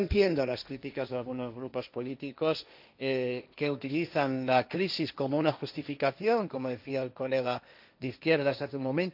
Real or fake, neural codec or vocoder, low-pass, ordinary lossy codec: fake; codec, 16 kHz, 1.1 kbps, Voila-Tokenizer; 5.4 kHz; none